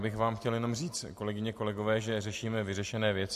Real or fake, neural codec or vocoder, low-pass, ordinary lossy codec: real; none; 14.4 kHz; MP3, 64 kbps